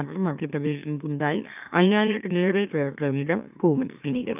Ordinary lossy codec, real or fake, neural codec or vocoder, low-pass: none; fake; autoencoder, 44.1 kHz, a latent of 192 numbers a frame, MeloTTS; 3.6 kHz